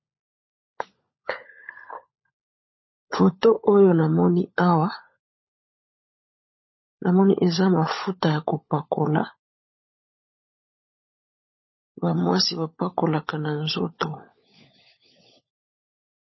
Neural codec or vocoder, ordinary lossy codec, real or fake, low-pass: codec, 16 kHz, 16 kbps, FunCodec, trained on LibriTTS, 50 frames a second; MP3, 24 kbps; fake; 7.2 kHz